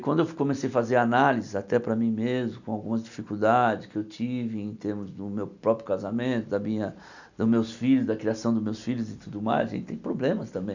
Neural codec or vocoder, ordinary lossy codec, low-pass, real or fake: none; none; 7.2 kHz; real